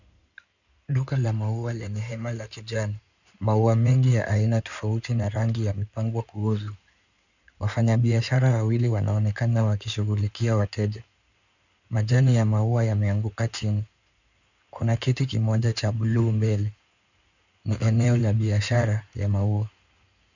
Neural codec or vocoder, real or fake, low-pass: codec, 16 kHz in and 24 kHz out, 2.2 kbps, FireRedTTS-2 codec; fake; 7.2 kHz